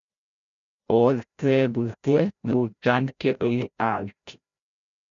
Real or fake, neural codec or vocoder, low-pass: fake; codec, 16 kHz, 0.5 kbps, FreqCodec, larger model; 7.2 kHz